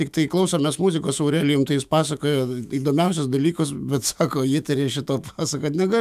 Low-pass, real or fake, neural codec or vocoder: 14.4 kHz; fake; autoencoder, 48 kHz, 128 numbers a frame, DAC-VAE, trained on Japanese speech